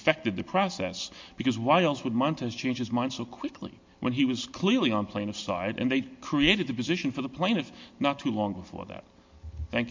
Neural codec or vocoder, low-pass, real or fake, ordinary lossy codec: none; 7.2 kHz; real; MP3, 48 kbps